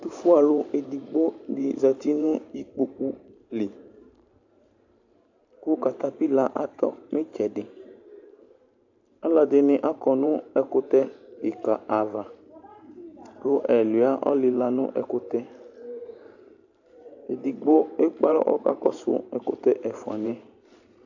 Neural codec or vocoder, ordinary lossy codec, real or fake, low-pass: vocoder, 44.1 kHz, 128 mel bands every 512 samples, BigVGAN v2; AAC, 48 kbps; fake; 7.2 kHz